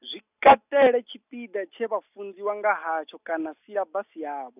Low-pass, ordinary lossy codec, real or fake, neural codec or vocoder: 3.6 kHz; none; real; none